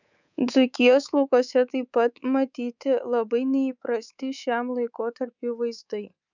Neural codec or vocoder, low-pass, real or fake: codec, 24 kHz, 3.1 kbps, DualCodec; 7.2 kHz; fake